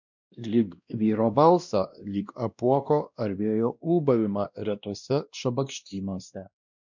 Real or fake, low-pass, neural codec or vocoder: fake; 7.2 kHz; codec, 16 kHz, 1 kbps, X-Codec, WavLM features, trained on Multilingual LibriSpeech